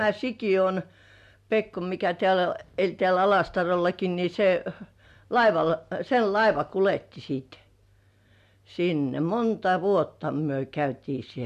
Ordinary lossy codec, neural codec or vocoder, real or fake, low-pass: MP3, 64 kbps; none; real; 14.4 kHz